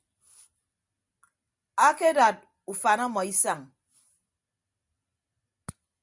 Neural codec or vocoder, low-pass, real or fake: none; 10.8 kHz; real